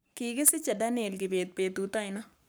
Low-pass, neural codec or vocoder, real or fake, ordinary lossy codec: none; codec, 44.1 kHz, 7.8 kbps, Pupu-Codec; fake; none